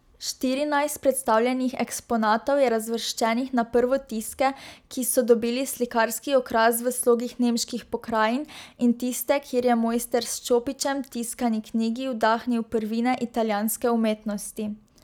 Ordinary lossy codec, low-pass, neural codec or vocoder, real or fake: none; none; none; real